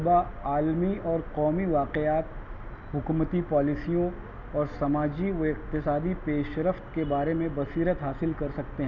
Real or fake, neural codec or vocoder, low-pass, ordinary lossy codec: real; none; 7.2 kHz; none